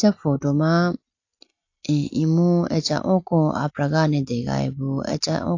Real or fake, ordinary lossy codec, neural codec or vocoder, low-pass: real; AAC, 48 kbps; none; 7.2 kHz